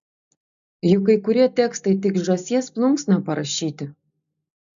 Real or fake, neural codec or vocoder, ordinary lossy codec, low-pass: real; none; AAC, 96 kbps; 7.2 kHz